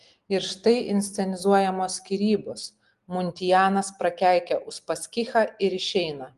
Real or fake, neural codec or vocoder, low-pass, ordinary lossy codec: real; none; 10.8 kHz; Opus, 24 kbps